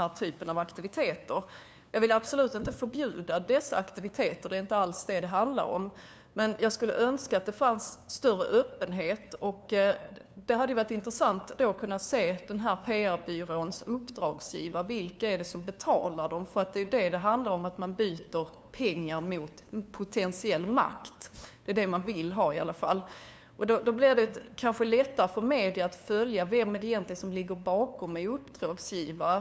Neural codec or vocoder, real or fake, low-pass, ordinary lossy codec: codec, 16 kHz, 2 kbps, FunCodec, trained on LibriTTS, 25 frames a second; fake; none; none